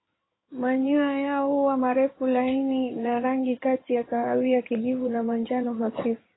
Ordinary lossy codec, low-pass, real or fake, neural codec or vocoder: AAC, 16 kbps; 7.2 kHz; fake; codec, 16 kHz in and 24 kHz out, 2.2 kbps, FireRedTTS-2 codec